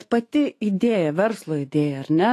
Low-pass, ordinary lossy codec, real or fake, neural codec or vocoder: 14.4 kHz; AAC, 48 kbps; fake; autoencoder, 48 kHz, 128 numbers a frame, DAC-VAE, trained on Japanese speech